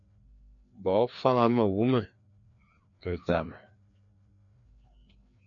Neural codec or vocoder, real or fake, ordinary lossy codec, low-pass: codec, 16 kHz, 2 kbps, FreqCodec, larger model; fake; MP3, 48 kbps; 7.2 kHz